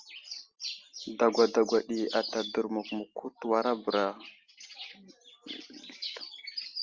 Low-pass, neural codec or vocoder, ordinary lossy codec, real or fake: 7.2 kHz; none; Opus, 32 kbps; real